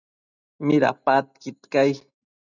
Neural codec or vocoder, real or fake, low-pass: none; real; 7.2 kHz